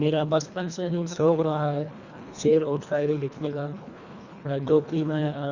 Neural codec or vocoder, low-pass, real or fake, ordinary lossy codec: codec, 24 kHz, 1.5 kbps, HILCodec; 7.2 kHz; fake; Opus, 64 kbps